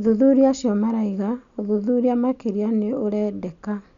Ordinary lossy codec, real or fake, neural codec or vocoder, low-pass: none; real; none; 7.2 kHz